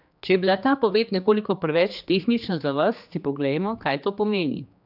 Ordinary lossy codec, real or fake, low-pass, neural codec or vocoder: none; fake; 5.4 kHz; codec, 16 kHz, 2 kbps, X-Codec, HuBERT features, trained on general audio